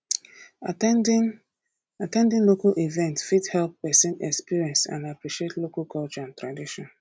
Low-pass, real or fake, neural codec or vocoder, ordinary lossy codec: none; real; none; none